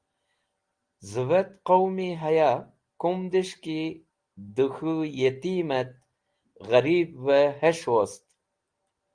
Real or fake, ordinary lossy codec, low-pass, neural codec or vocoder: real; Opus, 24 kbps; 9.9 kHz; none